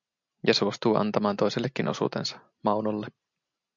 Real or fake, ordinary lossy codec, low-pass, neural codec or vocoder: real; MP3, 64 kbps; 7.2 kHz; none